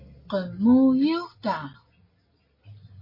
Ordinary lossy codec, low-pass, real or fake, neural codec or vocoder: MP3, 24 kbps; 5.4 kHz; real; none